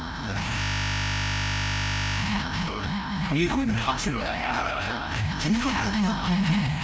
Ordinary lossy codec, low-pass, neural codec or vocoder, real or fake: none; none; codec, 16 kHz, 0.5 kbps, FreqCodec, larger model; fake